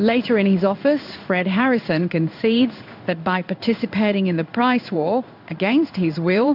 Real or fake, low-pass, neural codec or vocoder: fake; 5.4 kHz; codec, 16 kHz in and 24 kHz out, 1 kbps, XY-Tokenizer